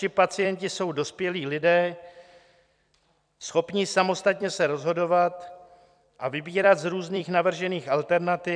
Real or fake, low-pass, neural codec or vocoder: fake; 9.9 kHz; vocoder, 44.1 kHz, 128 mel bands every 256 samples, BigVGAN v2